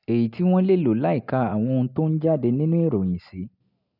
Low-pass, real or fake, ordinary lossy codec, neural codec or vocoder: 5.4 kHz; real; none; none